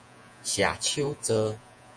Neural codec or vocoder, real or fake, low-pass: vocoder, 48 kHz, 128 mel bands, Vocos; fake; 9.9 kHz